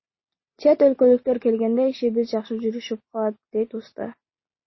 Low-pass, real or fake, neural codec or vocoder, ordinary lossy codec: 7.2 kHz; real; none; MP3, 24 kbps